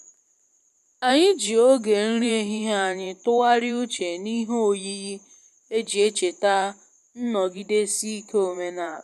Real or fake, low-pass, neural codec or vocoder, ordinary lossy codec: fake; 14.4 kHz; vocoder, 44.1 kHz, 128 mel bands every 512 samples, BigVGAN v2; MP3, 96 kbps